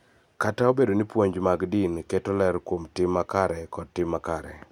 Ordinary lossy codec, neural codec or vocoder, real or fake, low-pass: none; none; real; 19.8 kHz